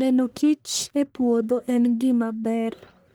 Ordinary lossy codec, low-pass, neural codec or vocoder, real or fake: none; none; codec, 44.1 kHz, 1.7 kbps, Pupu-Codec; fake